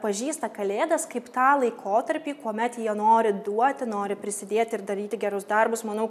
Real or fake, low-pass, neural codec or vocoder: real; 14.4 kHz; none